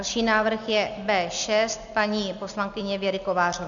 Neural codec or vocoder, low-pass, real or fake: none; 7.2 kHz; real